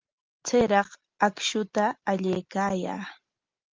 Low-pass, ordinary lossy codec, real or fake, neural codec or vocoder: 7.2 kHz; Opus, 32 kbps; real; none